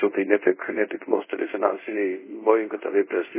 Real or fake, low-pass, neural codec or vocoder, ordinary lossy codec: fake; 3.6 kHz; codec, 24 kHz, 0.5 kbps, DualCodec; MP3, 16 kbps